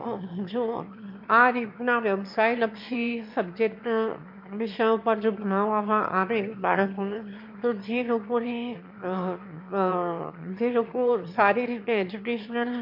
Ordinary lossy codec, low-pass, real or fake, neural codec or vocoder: MP3, 48 kbps; 5.4 kHz; fake; autoencoder, 22.05 kHz, a latent of 192 numbers a frame, VITS, trained on one speaker